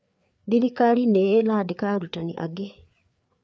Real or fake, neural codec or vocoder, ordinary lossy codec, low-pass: fake; codec, 16 kHz, 4 kbps, FreqCodec, larger model; none; none